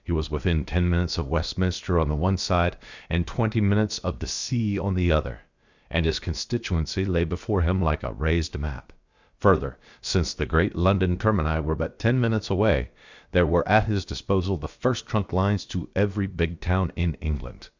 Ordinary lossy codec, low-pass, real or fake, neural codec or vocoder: Opus, 64 kbps; 7.2 kHz; fake; codec, 16 kHz, about 1 kbps, DyCAST, with the encoder's durations